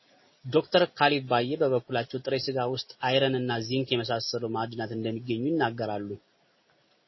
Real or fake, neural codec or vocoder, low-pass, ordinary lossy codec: real; none; 7.2 kHz; MP3, 24 kbps